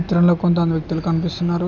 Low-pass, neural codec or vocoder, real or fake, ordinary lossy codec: 7.2 kHz; none; real; none